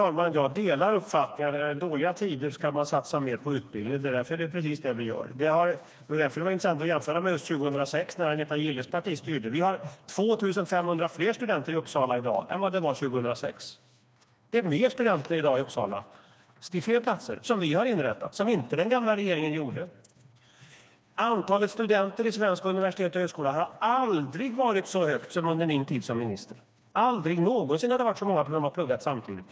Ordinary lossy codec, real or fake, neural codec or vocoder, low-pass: none; fake; codec, 16 kHz, 2 kbps, FreqCodec, smaller model; none